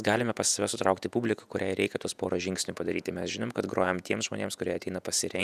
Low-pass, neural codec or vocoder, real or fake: 14.4 kHz; vocoder, 48 kHz, 128 mel bands, Vocos; fake